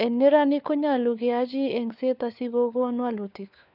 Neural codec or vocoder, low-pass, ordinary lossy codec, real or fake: codec, 16 kHz, 4 kbps, FunCodec, trained on LibriTTS, 50 frames a second; 5.4 kHz; none; fake